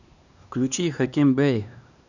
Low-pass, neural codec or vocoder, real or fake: 7.2 kHz; codec, 16 kHz, 2 kbps, X-Codec, HuBERT features, trained on LibriSpeech; fake